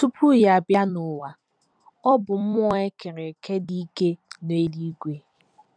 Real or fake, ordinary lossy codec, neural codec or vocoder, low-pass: fake; none; vocoder, 44.1 kHz, 128 mel bands every 256 samples, BigVGAN v2; 9.9 kHz